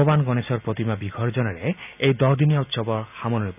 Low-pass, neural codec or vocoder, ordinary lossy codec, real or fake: 3.6 kHz; none; none; real